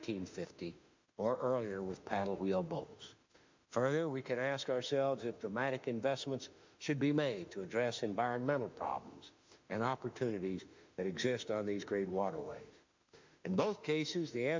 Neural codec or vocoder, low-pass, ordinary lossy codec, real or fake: autoencoder, 48 kHz, 32 numbers a frame, DAC-VAE, trained on Japanese speech; 7.2 kHz; MP3, 64 kbps; fake